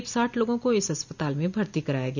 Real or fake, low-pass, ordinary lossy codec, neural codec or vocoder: real; 7.2 kHz; none; none